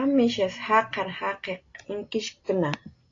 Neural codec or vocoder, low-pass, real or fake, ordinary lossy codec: none; 7.2 kHz; real; AAC, 32 kbps